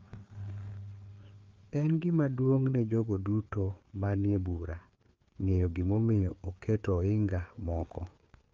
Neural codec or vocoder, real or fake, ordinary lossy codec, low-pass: codec, 16 kHz, 4 kbps, FreqCodec, larger model; fake; Opus, 24 kbps; 7.2 kHz